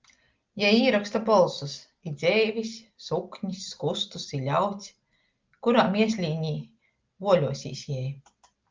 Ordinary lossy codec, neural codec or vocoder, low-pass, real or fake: Opus, 32 kbps; none; 7.2 kHz; real